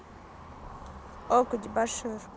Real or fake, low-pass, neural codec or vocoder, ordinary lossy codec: real; none; none; none